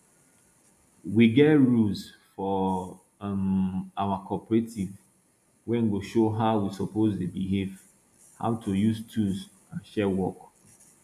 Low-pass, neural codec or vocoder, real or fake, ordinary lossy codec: 14.4 kHz; none; real; none